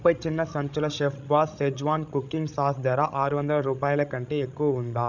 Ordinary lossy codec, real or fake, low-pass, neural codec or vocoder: none; fake; 7.2 kHz; codec, 16 kHz, 16 kbps, FreqCodec, larger model